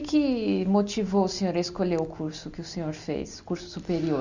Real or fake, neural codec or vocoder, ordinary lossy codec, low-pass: real; none; none; 7.2 kHz